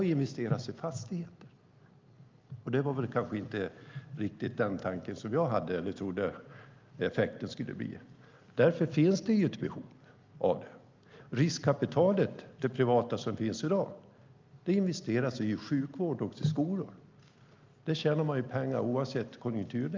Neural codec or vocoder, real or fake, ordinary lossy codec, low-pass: none; real; Opus, 24 kbps; 7.2 kHz